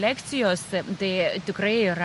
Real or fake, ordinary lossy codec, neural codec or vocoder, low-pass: real; MP3, 48 kbps; none; 14.4 kHz